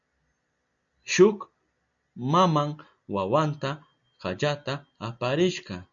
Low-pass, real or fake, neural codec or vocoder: 7.2 kHz; real; none